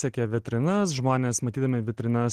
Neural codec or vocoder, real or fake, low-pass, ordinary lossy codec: none; real; 14.4 kHz; Opus, 16 kbps